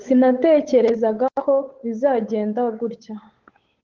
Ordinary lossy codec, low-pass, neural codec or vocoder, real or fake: Opus, 16 kbps; 7.2 kHz; codec, 16 kHz, 8 kbps, FunCodec, trained on Chinese and English, 25 frames a second; fake